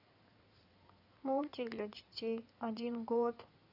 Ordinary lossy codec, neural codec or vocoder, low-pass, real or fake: none; codec, 16 kHz in and 24 kHz out, 2.2 kbps, FireRedTTS-2 codec; 5.4 kHz; fake